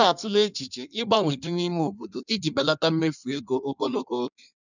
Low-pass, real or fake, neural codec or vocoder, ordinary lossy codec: 7.2 kHz; fake; codec, 16 kHz in and 24 kHz out, 1.1 kbps, FireRedTTS-2 codec; none